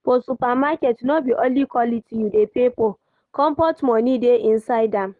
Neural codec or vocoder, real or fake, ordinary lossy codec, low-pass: none; real; Opus, 24 kbps; 10.8 kHz